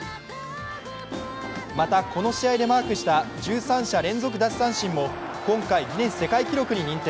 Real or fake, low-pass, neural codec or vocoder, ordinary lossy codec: real; none; none; none